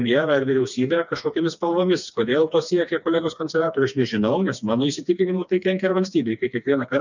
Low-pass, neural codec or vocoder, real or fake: 7.2 kHz; codec, 16 kHz, 2 kbps, FreqCodec, smaller model; fake